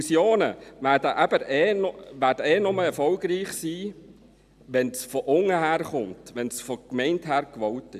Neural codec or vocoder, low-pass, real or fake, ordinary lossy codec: none; 14.4 kHz; real; none